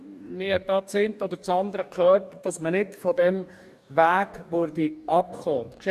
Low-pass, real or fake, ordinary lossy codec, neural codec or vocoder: 14.4 kHz; fake; none; codec, 44.1 kHz, 2.6 kbps, DAC